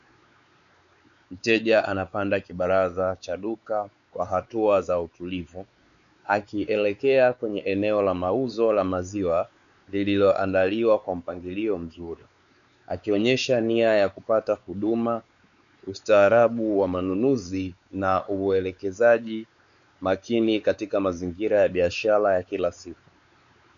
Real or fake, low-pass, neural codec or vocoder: fake; 7.2 kHz; codec, 16 kHz, 4 kbps, X-Codec, WavLM features, trained on Multilingual LibriSpeech